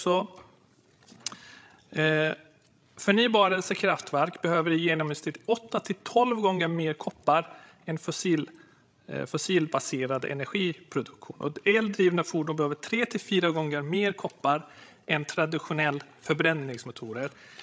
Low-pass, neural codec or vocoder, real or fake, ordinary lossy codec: none; codec, 16 kHz, 16 kbps, FreqCodec, larger model; fake; none